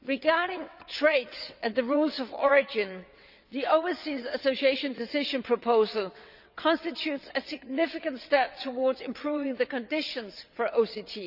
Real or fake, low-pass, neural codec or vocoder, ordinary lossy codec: fake; 5.4 kHz; vocoder, 22.05 kHz, 80 mel bands, WaveNeXt; none